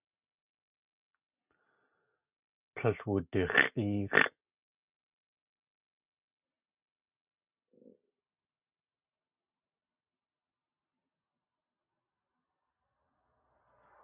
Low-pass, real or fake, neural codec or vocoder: 3.6 kHz; real; none